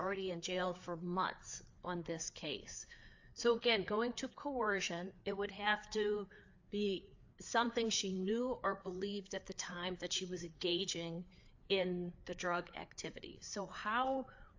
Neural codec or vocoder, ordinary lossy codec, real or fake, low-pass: codec, 16 kHz, 4 kbps, FreqCodec, larger model; AAC, 48 kbps; fake; 7.2 kHz